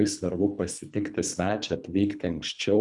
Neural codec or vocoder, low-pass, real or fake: codec, 24 kHz, 3 kbps, HILCodec; 10.8 kHz; fake